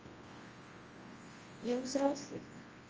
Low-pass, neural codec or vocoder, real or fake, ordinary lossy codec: 7.2 kHz; codec, 24 kHz, 0.9 kbps, WavTokenizer, large speech release; fake; Opus, 24 kbps